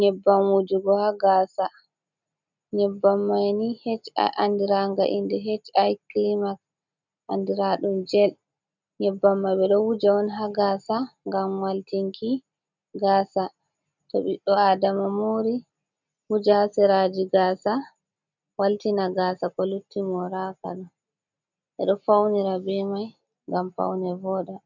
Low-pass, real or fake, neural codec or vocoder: 7.2 kHz; real; none